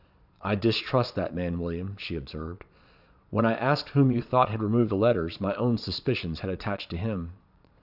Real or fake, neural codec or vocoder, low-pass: fake; vocoder, 22.05 kHz, 80 mel bands, Vocos; 5.4 kHz